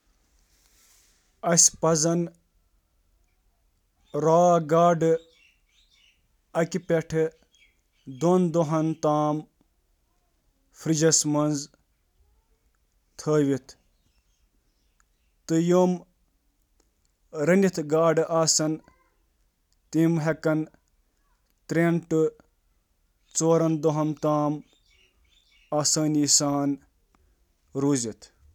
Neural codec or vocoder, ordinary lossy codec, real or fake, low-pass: none; none; real; 19.8 kHz